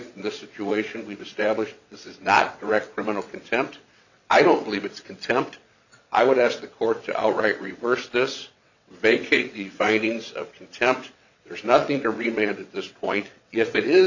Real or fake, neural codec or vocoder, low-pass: fake; vocoder, 22.05 kHz, 80 mel bands, WaveNeXt; 7.2 kHz